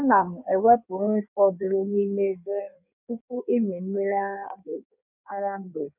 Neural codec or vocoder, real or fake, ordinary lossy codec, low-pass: codec, 16 kHz in and 24 kHz out, 1.1 kbps, FireRedTTS-2 codec; fake; none; 3.6 kHz